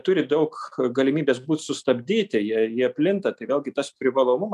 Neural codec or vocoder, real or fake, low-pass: none; real; 14.4 kHz